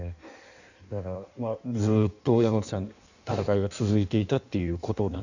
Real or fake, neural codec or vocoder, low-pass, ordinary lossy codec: fake; codec, 16 kHz in and 24 kHz out, 1.1 kbps, FireRedTTS-2 codec; 7.2 kHz; none